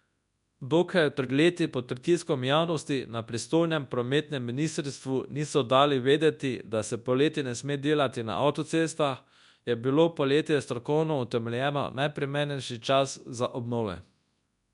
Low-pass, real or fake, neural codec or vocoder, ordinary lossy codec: 10.8 kHz; fake; codec, 24 kHz, 0.9 kbps, WavTokenizer, large speech release; none